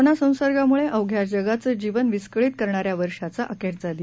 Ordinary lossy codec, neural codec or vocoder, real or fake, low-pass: none; none; real; none